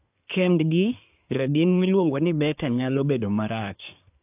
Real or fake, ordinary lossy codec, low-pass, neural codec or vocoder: fake; AAC, 32 kbps; 3.6 kHz; codec, 24 kHz, 1 kbps, SNAC